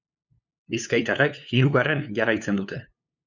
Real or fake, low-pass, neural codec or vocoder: fake; 7.2 kHz; codec, 16 kHz, 2 kbps, FunCodec, trained on LibriTTS, 25 frames a second